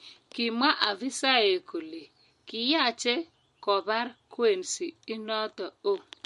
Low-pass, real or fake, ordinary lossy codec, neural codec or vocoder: 14.4 kHz; real; MP3, 48 kbps; none